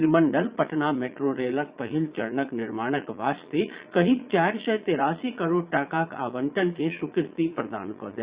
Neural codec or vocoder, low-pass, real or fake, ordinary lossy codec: vocoder, 22.05 kHz, 80 mel bands, Vocos; 3.6 kHz; fake; Opus, 64 kbps